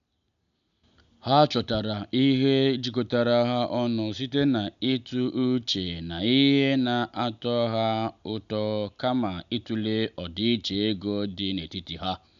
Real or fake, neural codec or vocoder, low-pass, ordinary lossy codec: real; none; 7.2 kHz; none